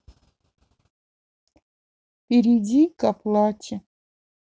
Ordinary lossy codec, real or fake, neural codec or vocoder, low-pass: none; real; none; none